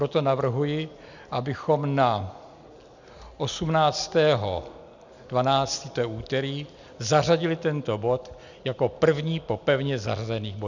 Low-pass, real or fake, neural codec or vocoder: 7.2 kHz; real; none